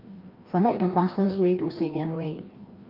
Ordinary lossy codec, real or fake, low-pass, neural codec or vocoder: Opus, 24 kbps; fake; 5.4 kHz; codec, 16 kHz, 1 kbps, FreqCodec, larger model